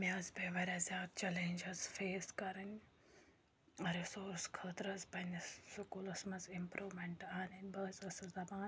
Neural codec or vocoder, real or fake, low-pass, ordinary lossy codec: none; real; none; none